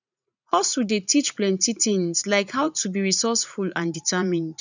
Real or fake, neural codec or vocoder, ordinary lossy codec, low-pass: fake; vocoder, 44.1 kHz, 80 mel bands, Vocos; none; 7.2 kHz